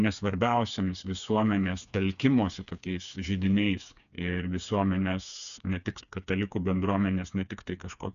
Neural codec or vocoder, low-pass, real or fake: codec, 16 kHz, 4 kbps, FreqCodec, smaller model; 7.2 kHz; fake